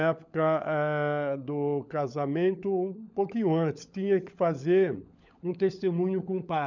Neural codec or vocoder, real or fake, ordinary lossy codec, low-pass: codec, 16 kHz, 16 kbps, FunCodec, trained on LibriTTS, 50 frames a second; fake; none; 7.2 kHz